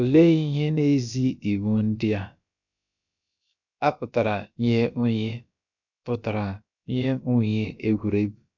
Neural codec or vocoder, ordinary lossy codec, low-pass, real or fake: codec, 16 kHz, about 1 kbps, DyCAST, with the encoder's durations; none; 7.2 kHz; fake